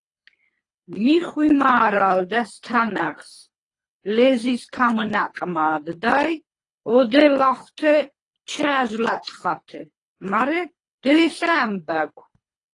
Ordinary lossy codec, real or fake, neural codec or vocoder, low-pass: AAC, 32 kbps; fake; codec, 24 kHz, 3 kbps, HILCodec; 10.8 kHz